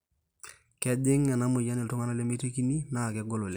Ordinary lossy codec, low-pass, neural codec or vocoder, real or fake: none; none; none; real